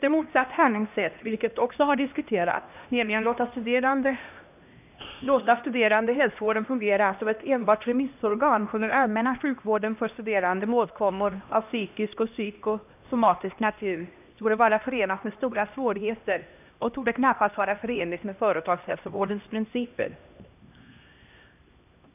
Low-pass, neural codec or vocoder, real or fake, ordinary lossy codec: 3.6 kHz; codec, 16 kHz, 1 kbps, X-Codec, HuBERT features, trained on LibriSpeech; fake; none